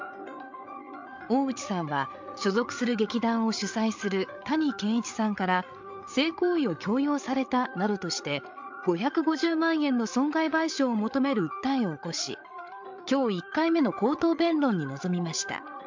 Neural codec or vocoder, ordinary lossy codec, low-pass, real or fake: codec, 16 kHz, 8 kbps, FreqCodec, larger model; MP3, 64 kbps; 7.2 kHz; fake